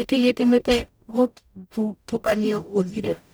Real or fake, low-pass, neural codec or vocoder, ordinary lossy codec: fake; none; codec, 44.1 kHz, 0.9 kbps, DAC; none